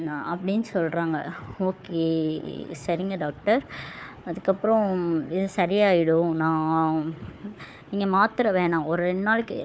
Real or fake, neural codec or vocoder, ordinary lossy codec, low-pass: fake; codec, 16 kHz, 4 kbps, FunCodec, trained on Chinese and English, 50 frames a second; none; none